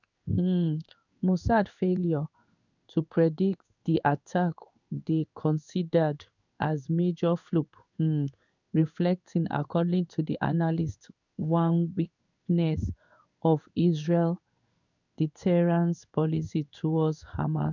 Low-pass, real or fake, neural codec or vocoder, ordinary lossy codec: 7.2 kHz; fake; codec, 16 kHz in and 24 kHz out, 1 kbps, XY-Tokenizer; none